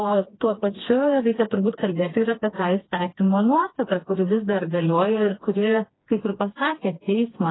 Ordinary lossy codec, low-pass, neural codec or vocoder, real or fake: AAC, 16 kbps; 7.2 kHz; codec, 16 kHz, 2 kbps, FreqCodec, smaller model; fake